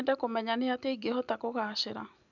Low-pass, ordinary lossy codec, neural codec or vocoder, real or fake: 7.2 kHz; none; none; real